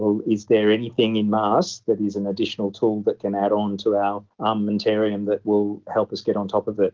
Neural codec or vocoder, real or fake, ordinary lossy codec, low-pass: none; real; Opus, 16 kbps; 7.2 kHz